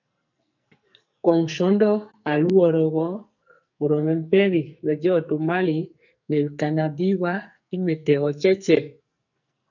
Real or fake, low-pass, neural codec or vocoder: fake; 7.2 kHz; codec, 44.1 kHz, 2.6 kbps, SNAC